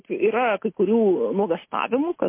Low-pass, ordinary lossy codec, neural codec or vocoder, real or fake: 3.6 kHz; MP3, 24 kbps; none; real